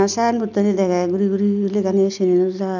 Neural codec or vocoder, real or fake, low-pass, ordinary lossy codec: none; real; 7.2 kHz; none